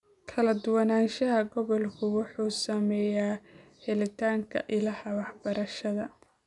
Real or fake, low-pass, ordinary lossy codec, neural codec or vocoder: real; 10.8 kHz; none; none